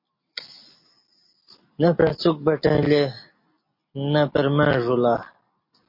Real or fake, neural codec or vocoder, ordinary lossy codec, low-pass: real; none; MP3, 32 kbps; 5.4 kHz